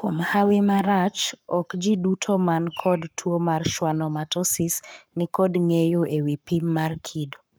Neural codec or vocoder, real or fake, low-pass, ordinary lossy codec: codec, 44.1 kHz, 7.8 kbps, Pupu-Codec; fake; none; none